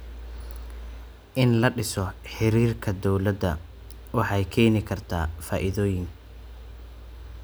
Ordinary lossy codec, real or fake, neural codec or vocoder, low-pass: none; real; none; none